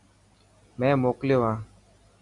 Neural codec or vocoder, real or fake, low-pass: none; real; 10.8 kHz